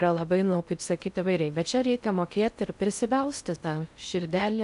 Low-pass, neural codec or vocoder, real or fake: 10.8 kHz; codec, 16 kHz in and 24 kHz out, 0.6 kbps, FocalCodec, streaming, 4096 codes; fake